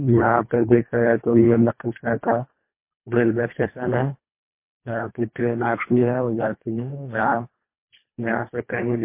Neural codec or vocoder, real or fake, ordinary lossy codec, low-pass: codec, 24 kHz, 1.5 kbps, HILCodec; fake; MP3, 24 kbps; 3.6 kHz